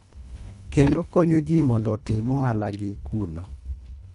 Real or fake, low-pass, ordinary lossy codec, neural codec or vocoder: fake; 10.8 kHz; none; codec, 24 kHz, 1.5 kbps, HILCodec